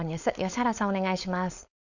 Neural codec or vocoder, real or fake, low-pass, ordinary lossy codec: codec, 16 kHz, 4.8 kbps, FACodec; fake; 7.2 kHz; none